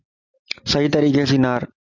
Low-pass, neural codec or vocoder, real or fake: 7.2 kHz; none; real